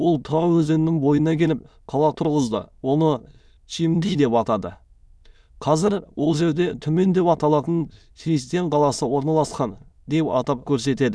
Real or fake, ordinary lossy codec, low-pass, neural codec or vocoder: fake; none; none; autoencoder, 22.05 kHz, a latent of 192 numbers a frame, VITS, trained on many speakers